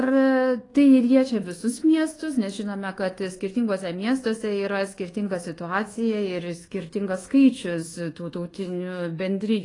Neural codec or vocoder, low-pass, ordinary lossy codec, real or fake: codec, 24 kHz, 1.2 kbps, DualCodec; 10.8 kHz; AAC, 32 kbps; fake